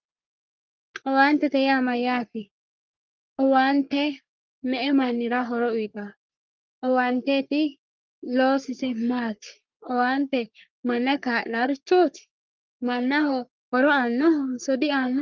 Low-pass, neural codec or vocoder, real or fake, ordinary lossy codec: 7.2 kHz; codec, 44.1 kHz, 3.4 kbps, Pupu-Codec; fake; Opus, 32 kbps